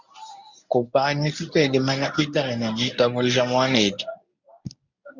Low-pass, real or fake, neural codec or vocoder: 7.2 kHz; fake; codec, 24 kHz, 0.9 kbps, WavTokenizer, medium speech release version 1